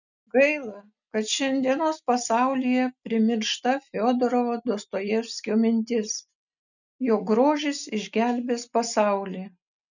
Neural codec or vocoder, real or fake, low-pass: none; real; 7.2 kHz